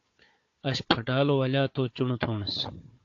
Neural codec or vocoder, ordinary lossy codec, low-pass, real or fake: codec, 16 kHz, 4 kbps, FunCodec, trained on Chinese and English, 50 frames a second; AAC, 48 kbps; 7.2 kHz; fake